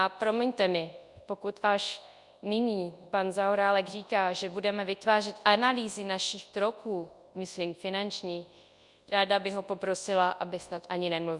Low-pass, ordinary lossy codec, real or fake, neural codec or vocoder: 10.8 kHz; Opus, 64 kbps; fake; codec, 24 kHz, 0.9 kbps, WavTokenizer, large speech release